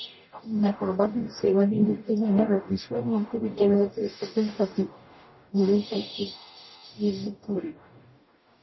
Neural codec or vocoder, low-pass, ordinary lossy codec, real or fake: codec, 44.1 kHz, 0.9 kbps, DAC; 7.2 kHz; MP3, 24 kbps; fake